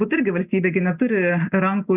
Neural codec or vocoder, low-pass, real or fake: none; 3.6 kHz; real